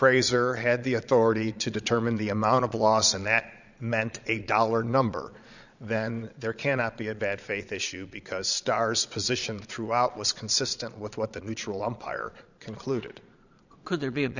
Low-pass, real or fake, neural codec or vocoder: 7.2 kHz; fake; vocoder, 22.05 kHz, 80 mel bands, Vocos